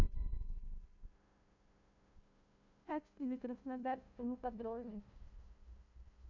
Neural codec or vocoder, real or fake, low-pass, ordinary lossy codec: codec, 16 kHz, 0.5 kbps, FunCodec, trained on LibriTTS, 25 frames a second; fake; 7.2 kHz; none